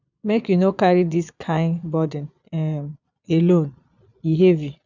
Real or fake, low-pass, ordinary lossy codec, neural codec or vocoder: fake; 7.2 kHz; none; vocoder, 22.05 kHz, 80 mel bands, Vocos